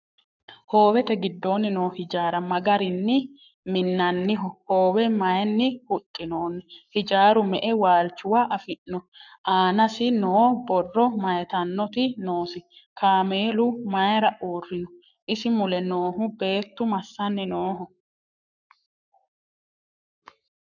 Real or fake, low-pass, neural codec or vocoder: fake; 7.2 kHz; codec, 44.1 kHz, 7.8 kbps, Pupu-Codec